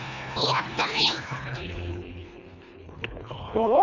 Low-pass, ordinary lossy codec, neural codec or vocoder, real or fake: 7.2 kHz; none; codec, 24 kHz, 1.5 kbps, HILCodec; fake